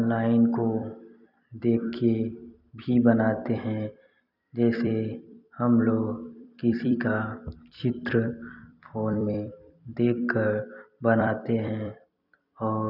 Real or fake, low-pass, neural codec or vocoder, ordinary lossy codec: fake; 5.4 kHz; vocoder, 44.1 kHz, 128 mel bands every 256 samples, BigVGAN v2; none